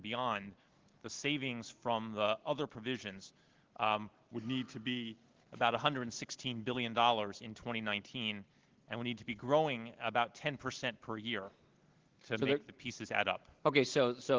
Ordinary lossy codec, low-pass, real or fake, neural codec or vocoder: Opus, 16 kbps; 7.2 kHz; real; none